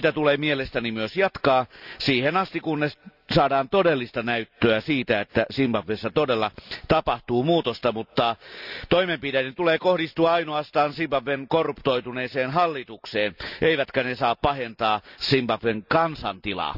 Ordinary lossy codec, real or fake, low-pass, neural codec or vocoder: none; real; 5.4 kHz; none